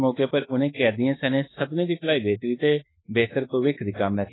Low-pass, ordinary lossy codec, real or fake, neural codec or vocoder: 7.2 kHz; AAC, 16 kbps; fake; autoencoder, 48 kHz, 32 numbers a frame, DAC-VAE, trained on Japanese speech